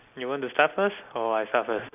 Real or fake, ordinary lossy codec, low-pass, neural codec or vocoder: real; none; 3.6 kHz; none